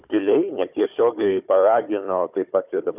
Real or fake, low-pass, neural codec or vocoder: fake; 3.6 kHz; codec, 16 kHz, 4 kbps, FunCodec, trained on Chinese and English, 50 frames a second